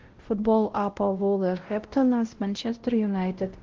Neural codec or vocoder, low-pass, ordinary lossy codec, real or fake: codec, 16 kHz, 0.5 kbps, X-Codec, WavLM features, trained on Multilingual LibriSpeech; 7.2 kHz; Opus, 16 kbps; fake